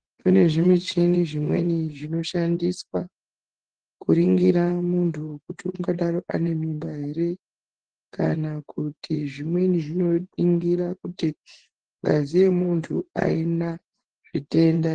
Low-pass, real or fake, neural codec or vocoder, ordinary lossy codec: 9.9 kHz; fake; vocoder, 24 kHz, 100 mel bands, Vocos; Opus, 16 kbps